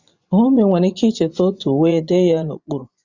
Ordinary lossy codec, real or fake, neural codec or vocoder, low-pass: Opus, 64 kbps; fake; vocoder, 24 kHz, 100 mel bands, Vocos; 7.2 kHz